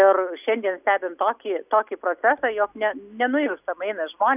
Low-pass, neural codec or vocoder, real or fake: 3.6 kHz; none; real